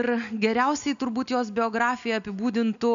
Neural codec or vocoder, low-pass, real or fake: none; 7.2 kHz; real